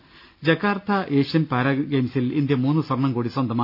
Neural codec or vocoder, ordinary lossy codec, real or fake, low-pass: none; AAC, 48 kbps; real; 5.4 kHz